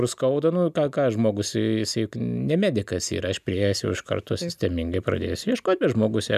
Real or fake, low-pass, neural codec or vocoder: real; 14.4 kHz; none